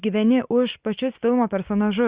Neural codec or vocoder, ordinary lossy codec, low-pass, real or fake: none; Opus, 64 kbps; 3.6 kHz; real